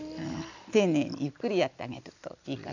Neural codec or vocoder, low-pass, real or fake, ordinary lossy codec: none; 7.2 kHz; real; none